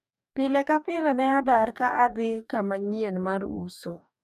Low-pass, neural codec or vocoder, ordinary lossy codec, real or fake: 14.4 kHz; codec, 44.1 kHz, 2.6 kbps, DAC; none; fake